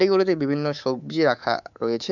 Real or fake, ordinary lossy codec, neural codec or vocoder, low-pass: fake; none; codec, 24 kHz, 3.1 kbps, DualCodec; 7.2 kHz